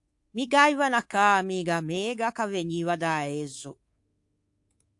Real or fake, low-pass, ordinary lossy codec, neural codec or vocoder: fake; 10.8 kHz; AAC, 64 kbps; codec, 24 kHz, 3.1 kbps, DualCodec